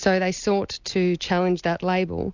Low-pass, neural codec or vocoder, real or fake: 7.2 kHz; none; real